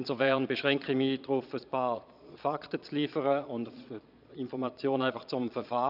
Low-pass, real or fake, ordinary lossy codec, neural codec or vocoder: 5.4 kHz; fake; none; vocoder, 22.05 kHz, 80 mel bands, Vocos